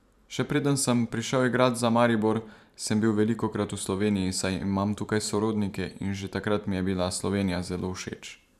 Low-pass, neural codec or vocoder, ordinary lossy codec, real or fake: 14.4 kHz; vocoder, 44.1 kHz, 128 mel bands every 512 samples, BigVGAN v2; none; fake